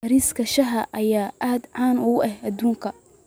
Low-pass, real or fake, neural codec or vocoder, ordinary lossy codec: none; real; none; none